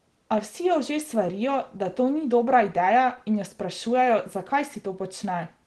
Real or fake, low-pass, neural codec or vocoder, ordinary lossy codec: real; 10.8 kHz; none; Opus, 16 kbps